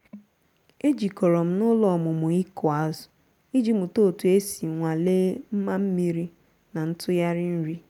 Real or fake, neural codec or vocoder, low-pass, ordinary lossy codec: real; none; 19.8 kHz; none